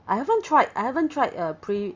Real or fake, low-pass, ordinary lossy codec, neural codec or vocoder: real; 7.2 kHz; Opus, 32 kbps; none